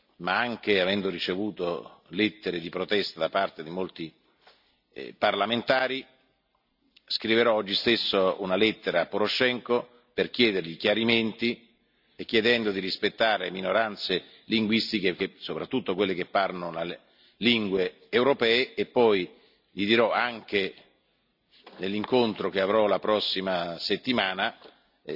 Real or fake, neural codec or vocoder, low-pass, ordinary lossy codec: real; none; 5.4 kHz; none